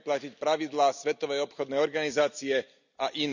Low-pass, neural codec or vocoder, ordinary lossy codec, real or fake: 7.2 kHz; none; none; real